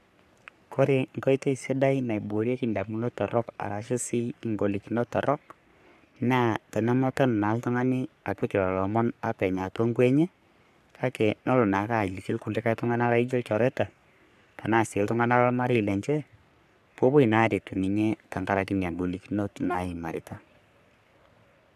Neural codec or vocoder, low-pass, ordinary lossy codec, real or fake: codec, 44.1 kHz, 3.4 kbps, Pupu-Codec; 14.4 kHz; none; fake